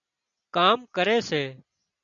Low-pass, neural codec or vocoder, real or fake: 7.2 kHz; none; real